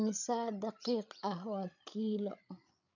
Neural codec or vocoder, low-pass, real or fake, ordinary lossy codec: codec, 16 kHz, 8 kbps, FreqCodec, larger model; 7.2 kHz; fake; none